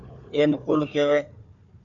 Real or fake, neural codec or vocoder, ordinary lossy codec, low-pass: fake; codec, 16 kHz, 4 kbps, FunCodec, trained on Chinese and English, 50 frames a second; MP3, 96 kbps; 7.2 kHz